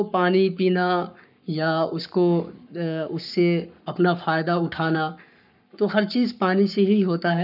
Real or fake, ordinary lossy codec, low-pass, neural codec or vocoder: fake; none; 5.4 kHz; codec, 44.1 kHz, 7.8 kbps, Pupu-Codec